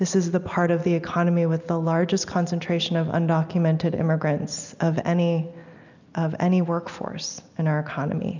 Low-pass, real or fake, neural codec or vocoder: 7.2 kHz; real; none